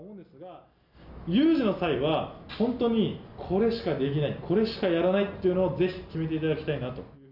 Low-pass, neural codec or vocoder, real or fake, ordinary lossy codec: 5.4 kHz; none; real; none